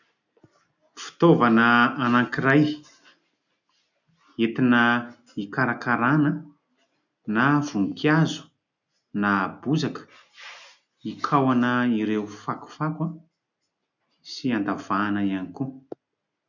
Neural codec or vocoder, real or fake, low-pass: none; real; 7.2 kHz